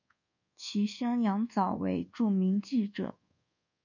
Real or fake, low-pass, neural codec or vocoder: fake; 7.2 kHz; codec, 24 kHz, 1.2 kbps, DualCodec